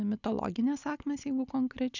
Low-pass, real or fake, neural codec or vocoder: 7.2 kHz; real; none